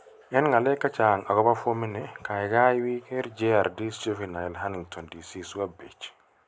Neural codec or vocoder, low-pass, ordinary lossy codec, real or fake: none; none; none; real